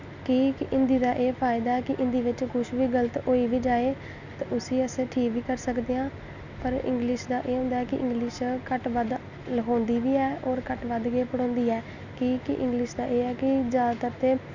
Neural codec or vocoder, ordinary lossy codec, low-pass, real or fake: none; none; 7.2 kHz; real